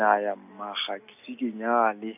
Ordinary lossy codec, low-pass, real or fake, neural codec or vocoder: none; 3.6 kHz; real; none